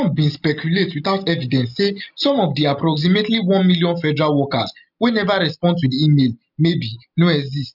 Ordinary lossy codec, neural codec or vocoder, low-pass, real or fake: none; none; 5.4 kHz; real